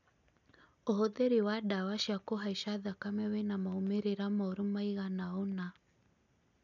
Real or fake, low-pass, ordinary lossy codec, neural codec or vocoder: real; 7.2 kHz; none; none